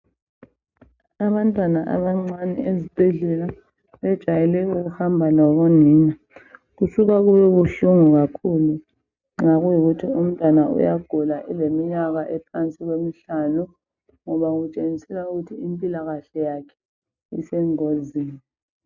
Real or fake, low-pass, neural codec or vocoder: real; 7.2 kHz; none